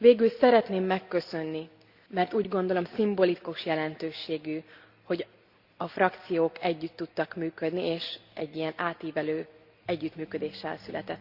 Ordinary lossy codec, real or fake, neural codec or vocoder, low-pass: Opus, 64 kbps; real; none; 5.4 kHz